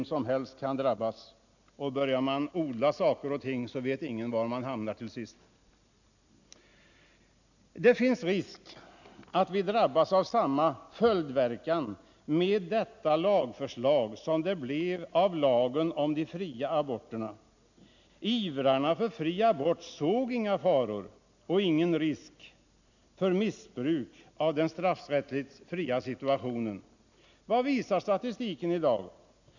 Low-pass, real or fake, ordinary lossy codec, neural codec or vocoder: 7.2 kHz; real; none; none